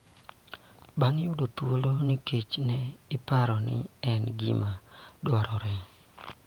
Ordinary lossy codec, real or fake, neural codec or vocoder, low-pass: Opus, 32 kbps; fake; vocoder, 48 kHz, 128 mel bands, Vocos; 19.8 kHz